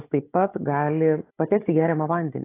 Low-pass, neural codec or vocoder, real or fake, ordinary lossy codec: 3.6 kHz; codec, 16 kHz, 16 kbps, FunCodec, trained on Chinese and English, 50 frames a second; fake; AAC, 16 kbps